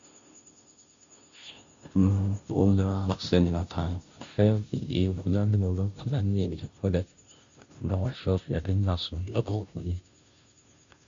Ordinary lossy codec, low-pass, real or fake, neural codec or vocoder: MP3, 96 kbps; 7.2 kHz; fake; codec, 16 kHz, 0.5 kbps, FunCodec, trained on Chinese and English, 25 frames a second